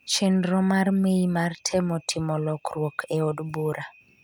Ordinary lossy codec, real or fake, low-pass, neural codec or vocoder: none; real; 19.8 kHz; none